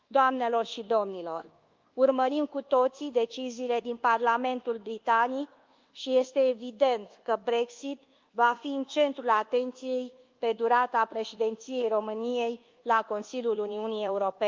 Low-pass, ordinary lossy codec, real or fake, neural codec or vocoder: 7.2 kHz; Opus, 32 kbps; fake; codec, 24 kHz, 1.2 kbps, DualCodec